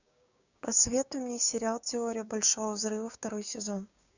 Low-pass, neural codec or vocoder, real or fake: 7.2 kHz; codec, 16 kHz, 6 kbps, DAC; fake